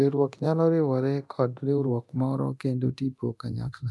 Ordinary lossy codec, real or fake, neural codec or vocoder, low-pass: none; fake; codec, 24 kHz, 0.9 kbps, DualCodec; none